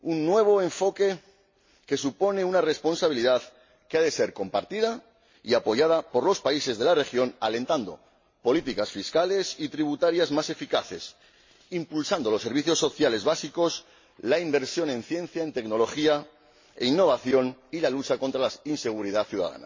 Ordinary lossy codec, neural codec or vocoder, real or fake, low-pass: MP3, 32 kbps; none; real; 7.2 kHz